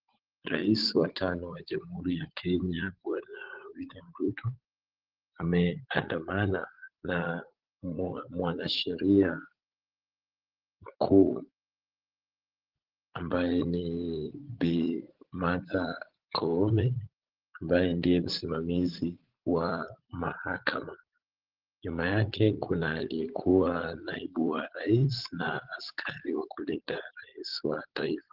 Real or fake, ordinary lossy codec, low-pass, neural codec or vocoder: fake; Opus, 16 kbps; 5.4 kHz; vocoder, 44.1 kHz, 80 mel bands, Vocos